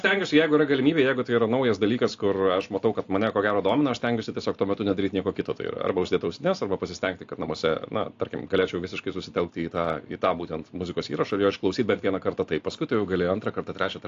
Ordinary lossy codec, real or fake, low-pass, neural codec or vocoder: AAC, 48 kbps; real; 7.2 kHz; none